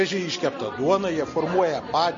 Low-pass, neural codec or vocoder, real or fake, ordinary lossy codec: 7.2 kHz; none; real; MP3, 32 kbps